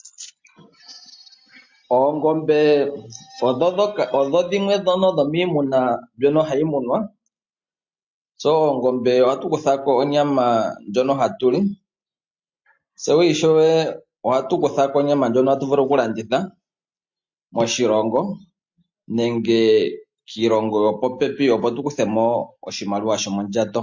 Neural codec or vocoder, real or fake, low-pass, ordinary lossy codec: none; real; 7.2 kHz; MP3, 48 kbps